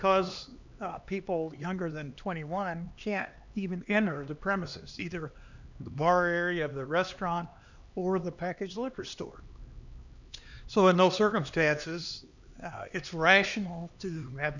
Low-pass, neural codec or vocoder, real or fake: 7.2 kHz; codec, 16 kHz, 2 kbps, X-Codec, HuBERT features, trained on LibriSpeech; fake